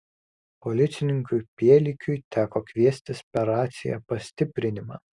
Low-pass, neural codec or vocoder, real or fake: 10.8 kHz; none; real